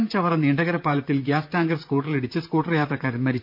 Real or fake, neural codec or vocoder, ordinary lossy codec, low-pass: fake; vocoder, 44.1 kHz, 128 mel bands, Pupu-Vocoder; none; 5.4 kHz